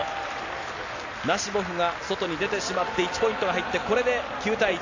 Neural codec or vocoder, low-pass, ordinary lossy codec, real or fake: none; 7.2 kHz; AAC, 48 kbps; real